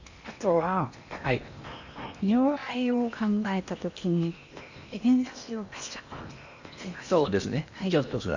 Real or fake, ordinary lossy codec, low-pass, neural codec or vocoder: fake; none; 7.2 kHz; codec, 16 kHz in and 24 kHz out, 0.8 kbps, FocalCodec, streaming, 65536 codes